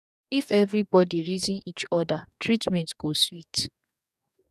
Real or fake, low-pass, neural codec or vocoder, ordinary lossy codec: fake; 14.4 kHz; codec, 44.1 kHz, 2.6 kbps, DAC; none